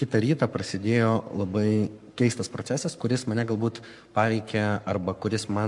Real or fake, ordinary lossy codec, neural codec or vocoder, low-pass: fake; MP3, 64 kbps; codec, 44.1 kHz, 7.8 kbps, Pupu-Codec; 10.8 kHz